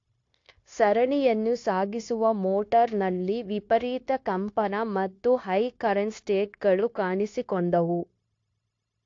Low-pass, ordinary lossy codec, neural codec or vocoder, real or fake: 7.2 kHz; AAC, 48 kbps; codec, 16 kHz, 0.9 kbps, LongCat-Audio-Codec; fake